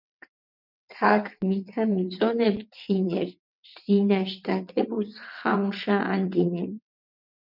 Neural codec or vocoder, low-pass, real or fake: vocoder, 22.05 kHz, 80 mel bands, WaveNeXt; 5.4 kHz; fake